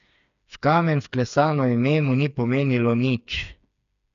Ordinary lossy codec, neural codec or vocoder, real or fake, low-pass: none; codec, 16 kHz, 4 kbps, FreqCodec, smaller model; fake; 7.2 kHz